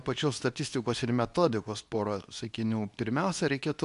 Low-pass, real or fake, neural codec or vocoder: 10.8 kHz; fake; codec, 24 kHz, 0.9 kbps, WavTokenizer, medium speech release version 2